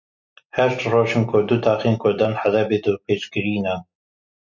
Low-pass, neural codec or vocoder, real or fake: 7.2 kHz; none; real